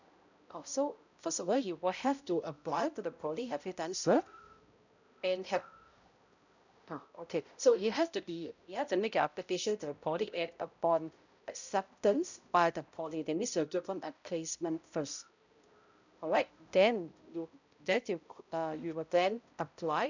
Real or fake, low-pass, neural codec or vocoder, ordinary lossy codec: fake; 7.2 kHz; codec, 16 kHz, 0.5 kbps, X-Codec, HuBERT features, trained on balanced general audio; none